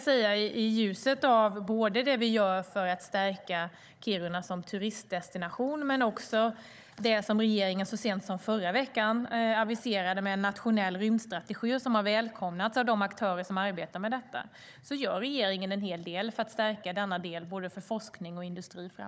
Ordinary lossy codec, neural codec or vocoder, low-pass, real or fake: none; codec, 16 kHz, 16 kbps, FunCodec, trained on Chinese and English, 50 frames a second; none; fake